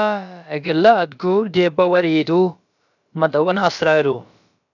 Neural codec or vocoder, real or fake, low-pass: codec, 16 kHz, about 1 kbps, DyCAST, with the encoder's durations; fake; 7.2 kHz